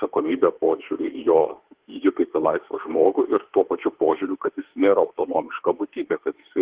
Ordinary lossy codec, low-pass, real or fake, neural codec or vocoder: Opus, 16 kbps; 3.6 kHz; fake; autoencoder, 48 kHz, 32 numbers a frame, DAC-VAE, trained on Japanese speech